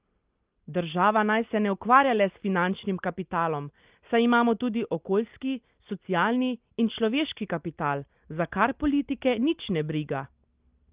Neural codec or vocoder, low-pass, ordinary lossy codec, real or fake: none; 3.6 kHz; Opus, 32 kbps; real